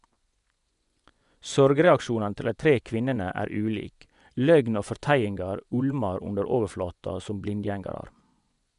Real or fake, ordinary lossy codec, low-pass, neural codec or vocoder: fake; none; 10.8 kHz; vocoder, 24 kHz, 100 mel bands, Vocos